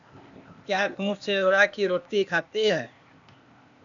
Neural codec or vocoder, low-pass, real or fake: codec, 16 kHz, 0.8 kbps, ZipCodec; 7.2 kHz; fake